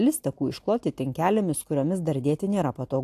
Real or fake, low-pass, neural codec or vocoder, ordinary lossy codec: real; 14.4 kHz; none; AAC, 64 kbps